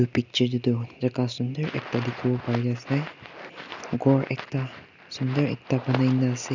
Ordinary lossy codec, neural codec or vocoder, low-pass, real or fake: none; none; 7.2 kHz; real